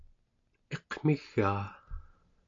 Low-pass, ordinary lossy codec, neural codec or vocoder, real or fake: 7.2 kHz; AAC, 48 kbps; none; real